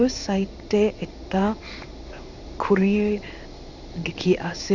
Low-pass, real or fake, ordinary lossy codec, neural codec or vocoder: 7.2 kHz; fake; none; codec, 16 kHz in and 24 kHz out, 1 kbps, XY-Tokenizer